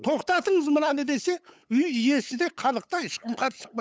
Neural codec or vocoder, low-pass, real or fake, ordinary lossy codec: codec, 16 kHz, 8 kbps, FunCodec, trained on LibriTTS, 25 frames a second; none; fake; none